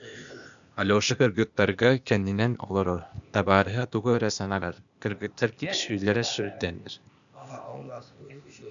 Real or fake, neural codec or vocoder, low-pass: fake; codec, 16 kHz, 0.8 kbps, ZipCodec; 7.2 kHz